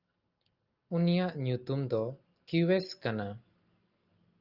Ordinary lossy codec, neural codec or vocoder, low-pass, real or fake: Opus, 24 kbps; none; 5.4 kHz; real